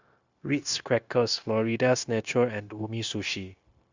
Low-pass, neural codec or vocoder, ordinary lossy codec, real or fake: 7.2 kHz; codec, 16 kHz, 0.9 kbps, LongCat-Audio-Codec; Opus, 64 kbps; fake